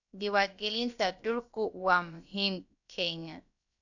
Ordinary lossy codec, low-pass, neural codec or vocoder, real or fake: none; 7.2 kHz; codec, 16 kHz, about 1 kbps, DyCAST, with the encoder's durations; fake